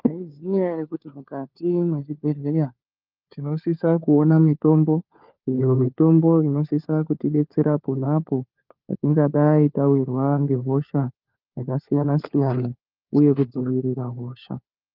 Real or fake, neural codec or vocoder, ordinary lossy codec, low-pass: fake; codec, 16 kHz, 4 kbps, FunCodec, trained on LibriTTS, 50 frames a second; Opus, 32 kbps; 5.4 kHz